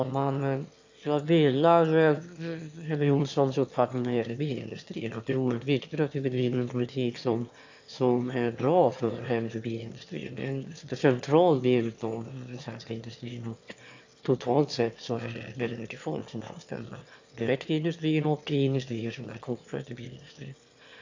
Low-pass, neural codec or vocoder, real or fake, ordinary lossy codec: 7.2 kHz; autoencoder, 22.05 kHz, a latent of 192 numbers a frame, VITS, trained on one speaker; fake; AAC, 48 kbps